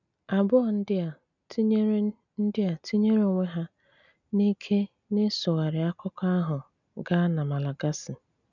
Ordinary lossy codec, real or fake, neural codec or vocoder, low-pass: none; real; none; 7.2 kHz